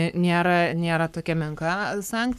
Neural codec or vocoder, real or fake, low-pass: codec, 44.1 kHz, 7.8 kbps, Pupu-Codec; fake; 14.4 kHz